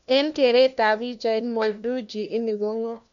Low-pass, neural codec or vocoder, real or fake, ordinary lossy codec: 7.2 kHz; codec, 16 kHz, 1 kbps, FunCodec, trained on LibriTTS, 50 frames a second; fake; none